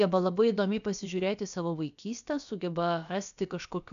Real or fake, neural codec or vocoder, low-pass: fake; codec, 16 kHz, about 1 kbps, DyCAST, with the encoder's durations; 7.2 kHz